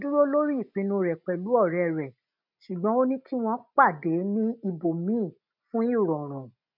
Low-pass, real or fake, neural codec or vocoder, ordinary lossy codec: 5.4 kHz; real; none; none